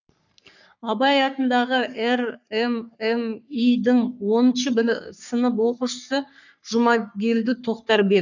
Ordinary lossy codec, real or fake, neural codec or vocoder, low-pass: none; fake; codec, 44.1 kHz, 3.4 kbps, Pupu-Codec; 7.2 kHz